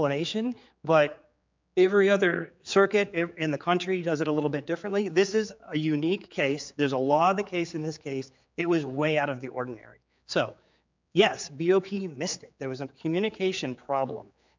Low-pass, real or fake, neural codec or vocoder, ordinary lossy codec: 7.2 kHz; fake; codec, 16 kHz, 4 kbps, X-Codec, HuBERT features, trained on general audio; MP3, 64 kbps